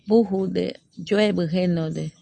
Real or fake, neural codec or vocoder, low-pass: real; none; 9.9 kHz